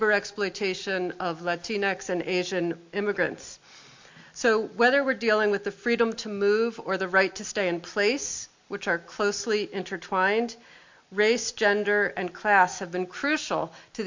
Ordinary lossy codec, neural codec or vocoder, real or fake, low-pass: MP3, 48 kbps; none; real; 7.2 kHz